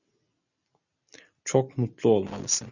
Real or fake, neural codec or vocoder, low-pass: real; none; 7.2 kHz